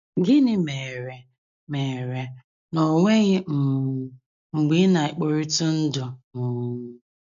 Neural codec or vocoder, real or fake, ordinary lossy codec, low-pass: none; real; none; 7.2 kHz